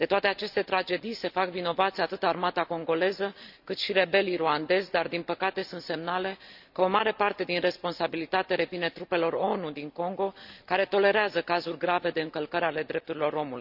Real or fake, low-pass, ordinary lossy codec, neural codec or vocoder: real; 5.4 kHz; none; none